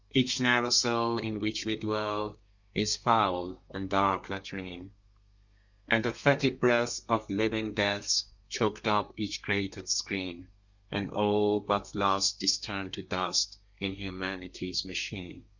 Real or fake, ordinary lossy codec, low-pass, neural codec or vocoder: fake; Opus, 64 kbps; 7.2 kHz; codec, 32 kHz, 1.9 kbps, SNAC